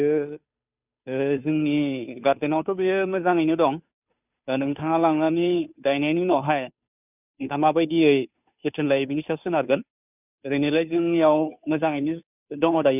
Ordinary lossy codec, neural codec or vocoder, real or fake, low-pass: none; codec, 16 kHz, 2 kbps, FunCodec, trained on Chinese and English, 25 frames a second; fake; 3.6 kHz